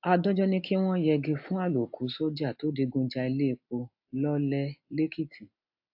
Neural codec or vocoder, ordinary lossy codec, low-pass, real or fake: none; AAC, 48 kbps; 5.4 kHz; real